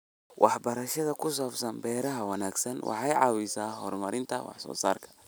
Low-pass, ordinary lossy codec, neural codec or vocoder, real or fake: none; none; none; real